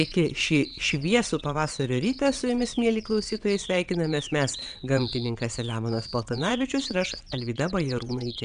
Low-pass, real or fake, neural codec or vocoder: 9.9 kHz; fake; vocoder, 22.05 kHz, 80 mel bands, WaveNeXt